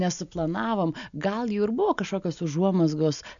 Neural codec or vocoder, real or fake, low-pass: none; real; 7.2 kHz